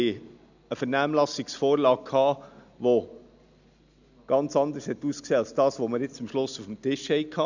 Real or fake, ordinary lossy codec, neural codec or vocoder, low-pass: real; none; none; 7.2 kHz